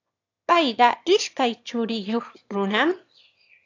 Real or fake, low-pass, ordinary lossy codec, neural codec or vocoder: fake; 7.2 kHz; AAC, 48 kbps; autoencoder, 22.05 kHz, a latent of 192 numbers a frame, VITS, trained on one speaker